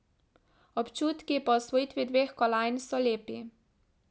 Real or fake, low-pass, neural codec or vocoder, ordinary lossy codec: real; none; none; none